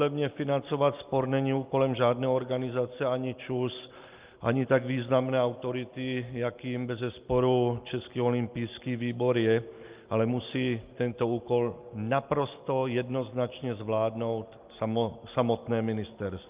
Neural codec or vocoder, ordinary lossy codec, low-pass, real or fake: none; Opus, 24 kbps; 3.6 kHz; real